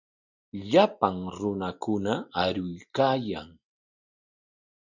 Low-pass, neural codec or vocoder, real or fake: 7.2 kHz; none; real